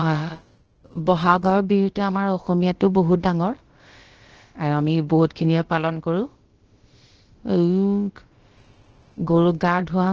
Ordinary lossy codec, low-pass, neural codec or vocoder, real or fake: Opus, 16 kbps; 7.2 kHz; codec, 16 kHz, about 1 kbps, DyCAST, with the encoder's durations; fake